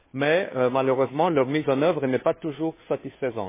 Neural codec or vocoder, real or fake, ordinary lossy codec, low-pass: codec, 16 kHz, 2 kbps, FunCodec, trained on Chinese and English, 25 frames a second; fake; MP3, 16 kbps; 3.6 kHz